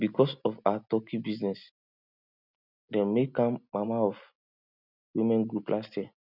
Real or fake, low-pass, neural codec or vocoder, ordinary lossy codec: real; 5.4 kHz; none; none